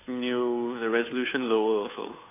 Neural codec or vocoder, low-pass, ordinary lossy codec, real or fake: codec, 16 kHz, 2 kbps, FunCodec, trained on Chinese and English, 25 frames a second; 3.6 kHz; AAC, 24 kbps; fake